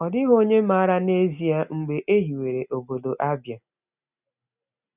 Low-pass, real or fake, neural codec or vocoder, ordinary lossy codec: 3.6 kHz; real; none; none